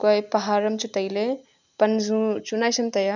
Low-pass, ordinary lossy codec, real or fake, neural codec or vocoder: 7.2 kHz; none; real; none